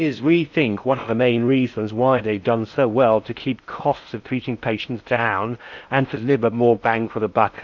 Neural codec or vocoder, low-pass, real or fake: codec, 16 kHz in and 24 kHz out, 0.6 kbps, FocalCodec, streaming, 4096 codes; 7.2 kHz; fake